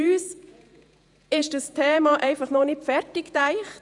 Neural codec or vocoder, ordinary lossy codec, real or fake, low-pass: vocoder, 48 kHz, 128 mel bands, Vocos; none; fake; 10.8 kHz